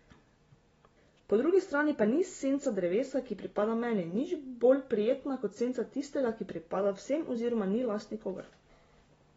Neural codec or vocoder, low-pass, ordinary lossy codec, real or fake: none; 14.4 kHz; AAC, 24 kbps; real